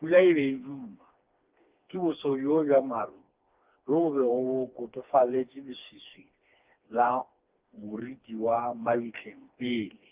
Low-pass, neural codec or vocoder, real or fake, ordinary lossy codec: 3.6 kHz; codec, 16 kHz, 2 kbps, FreqCodec, smaller model; fake; Opus, 32 kbps